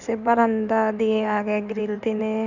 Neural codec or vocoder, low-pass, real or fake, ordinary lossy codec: codec, 16 kHz in and 24 kHz out, 2.2 kbps, FireRedTTS-2 codec; 7.2 kHz; fake; none